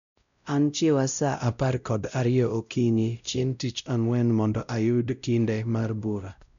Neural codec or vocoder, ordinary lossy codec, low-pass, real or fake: codec, 16 kHz, 0.5 kbps, X-Codec, WavLM features, trained on Multilingual LibriSpeech; none; 7.2 kHz; fake